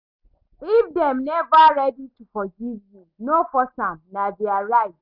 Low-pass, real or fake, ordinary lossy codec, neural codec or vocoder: 5.4 kHz; real; none; none